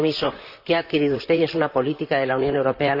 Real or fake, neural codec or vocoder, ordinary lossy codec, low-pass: fake; vocoder, 44.1 kHz, 128 mel bands, Pupu-Vocoder; Opus, 64 kbps; 5.4 kHz